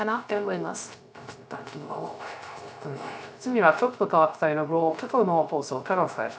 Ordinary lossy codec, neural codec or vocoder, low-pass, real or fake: none; codec, 16 kHz, 0.3 kbps, FocalCodec; none; fake